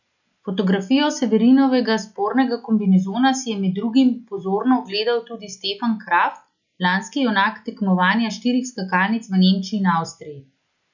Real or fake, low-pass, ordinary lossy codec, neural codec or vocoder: real; 7.2 kHz; none; none